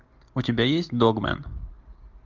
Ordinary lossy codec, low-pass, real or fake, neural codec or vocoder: Opus, 32 kbps; 7.2 kHz; real; none